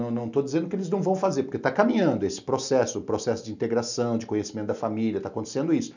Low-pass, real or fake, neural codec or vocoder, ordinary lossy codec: 7.2 kHz; real; none; none